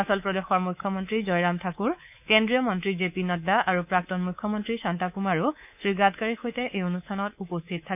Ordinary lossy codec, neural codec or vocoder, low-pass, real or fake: none; codec, 24 kHz, 3.1 kbps, DualCodec; 3.6 kHz; fake